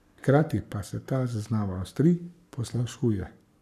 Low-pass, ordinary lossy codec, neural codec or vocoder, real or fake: 14.4 kHz; none; codec, 44.1 kHz, 7.8 kbps, Pupu-Codec; fake